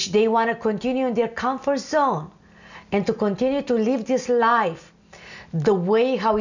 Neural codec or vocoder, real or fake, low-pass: none; real; 7.2 kHz